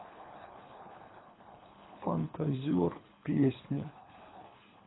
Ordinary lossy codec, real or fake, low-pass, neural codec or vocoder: AAC, 16 kbps; fake; 7.2 kHz; codec, 24 kHz, 3 kbps, HILCodec